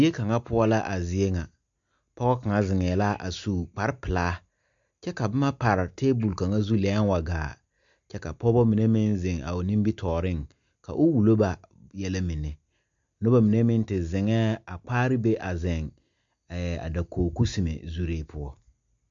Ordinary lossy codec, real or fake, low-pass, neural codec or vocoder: MP3, 64 kbps; real; 7.2 kHz; none